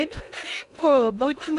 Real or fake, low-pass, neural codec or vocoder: fake; 10.8 kHz; codec, 16 kHz in and 24 kHz out, 0.6 kbps, FocalCodec, streaming, 2048 codes